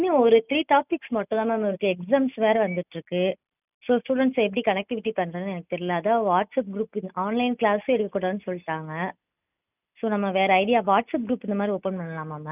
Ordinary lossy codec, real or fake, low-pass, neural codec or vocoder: none; real; 3.6 kHz; none